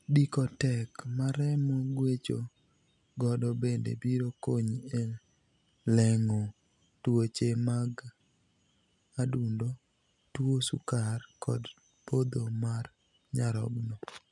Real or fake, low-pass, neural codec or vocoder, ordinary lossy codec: real; 10.8 kHz; none; none